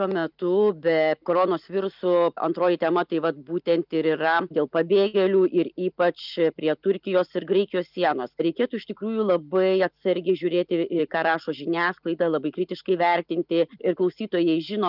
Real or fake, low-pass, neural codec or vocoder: real; 5.4 kHz; none